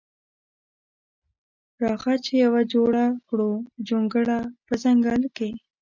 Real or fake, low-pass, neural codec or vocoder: real; 7.2 kHz; none